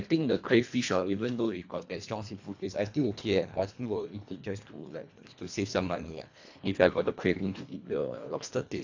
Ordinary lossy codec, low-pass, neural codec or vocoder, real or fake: AAC, 48 kbps; 7.2 kHz; codec, 24 kHz, 1.5 kbps, HILCodec; fake